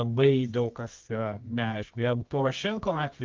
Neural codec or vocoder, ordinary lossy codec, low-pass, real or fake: codec, 24 kHz, 0.9 kbps, WavTokenizer, medium music audio release; Opus, 32 kbps; 7.2 kHz; fake